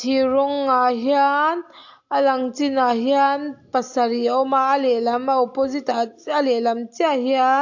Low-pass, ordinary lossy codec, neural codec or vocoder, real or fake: 7.2 kHz; AAC, 48 kbps; none; real